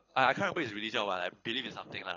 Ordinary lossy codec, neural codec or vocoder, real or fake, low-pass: AAC, 32 kbps; codec, 24 kHz, 6 kbps, HILCodec; fake; 7.2 kHz